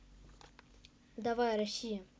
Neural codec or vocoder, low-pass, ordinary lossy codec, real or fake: none; none; none; real